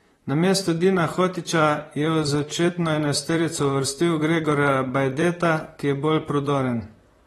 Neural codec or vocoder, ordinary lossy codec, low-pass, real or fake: vocoder, 44.1 kHz, 128 mel bands, Pupu-Vocoder; AAC, 32 kbps; 19.8 kHz; fake